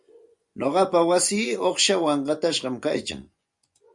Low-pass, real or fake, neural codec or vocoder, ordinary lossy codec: 10.8 kHz; fake; vocoder, 24 kHz, 100 mel bands, Vocos; MP3, 64 kbps